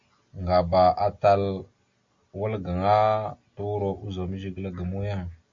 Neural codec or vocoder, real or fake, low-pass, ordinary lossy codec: none; real; 7.2 kHz; MP3, 48 kbps